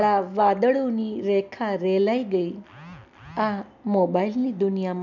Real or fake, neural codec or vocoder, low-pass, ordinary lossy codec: real; none; 7.2 kHz; none